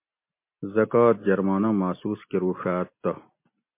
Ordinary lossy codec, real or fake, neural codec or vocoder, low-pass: MP3, 24 kbps; real; none; 3.6 kHz